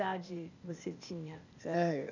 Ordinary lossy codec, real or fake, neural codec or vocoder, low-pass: none; fake; codec, 16 kHz, 0.8 kbps, ZipCodec; 7.2 kHz